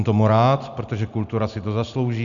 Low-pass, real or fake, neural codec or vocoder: 7.2 kHz; real; none